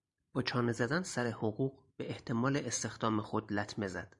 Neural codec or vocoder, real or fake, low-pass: vocoder, 44.1 kHz, 128 mel bands every 512 samples, BigVGAN v2; fake; 10.8 kHz